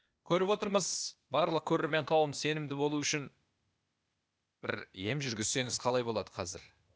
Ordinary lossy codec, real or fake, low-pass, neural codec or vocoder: none; fake; none; codec, 16 kHz, 0.8 kbps, ZipCodec